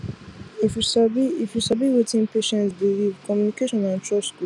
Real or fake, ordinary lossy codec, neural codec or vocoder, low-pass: real; none; none; 10.8 kHz